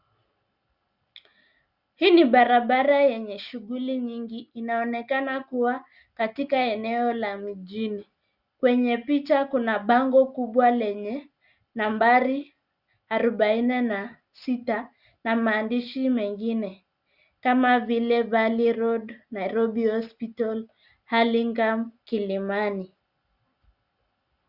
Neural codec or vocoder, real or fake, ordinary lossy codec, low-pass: none; real; Opus, 64 kbps; 5.4 kHz